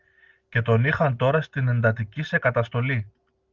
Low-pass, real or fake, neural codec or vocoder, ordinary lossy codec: 7.2 kHz; real; none; Opus, 32 kbps